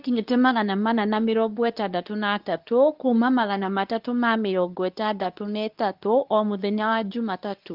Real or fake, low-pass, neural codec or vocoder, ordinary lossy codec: fake; 5.4 kHz; codec, 24 kHz, 0.9 kbps, WavTokenizer, medium speech release version 2; Opus, 32 kbps